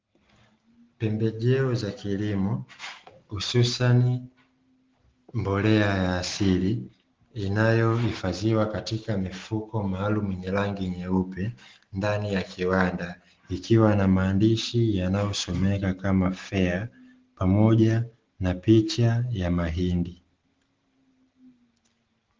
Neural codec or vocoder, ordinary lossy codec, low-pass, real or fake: none; Opus, 16 kbps; 7.2 kHz; real